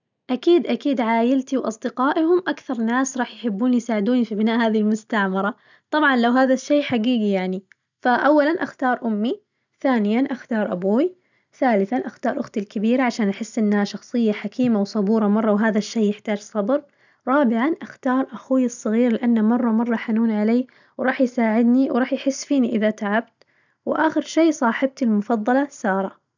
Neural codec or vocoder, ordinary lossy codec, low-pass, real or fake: none; none; 7.2 kHz; real